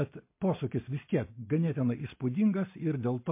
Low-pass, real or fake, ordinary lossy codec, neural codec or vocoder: 3.6 kHz; real; MP3, 24 kbps; none